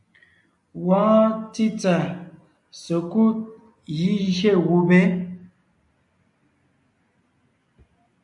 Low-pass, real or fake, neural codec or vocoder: 10.8 kHz; real; none